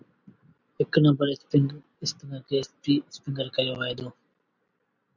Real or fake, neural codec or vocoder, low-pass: real; none; 7.2 kHz